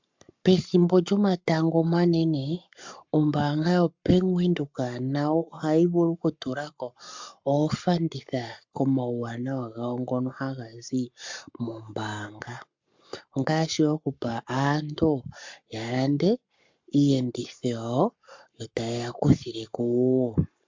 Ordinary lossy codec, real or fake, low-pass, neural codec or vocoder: MP3, 64 kbps; fake; 7.2 kHz; codec, 44.1 kHz, 7.8 kbps, Pupu-Codec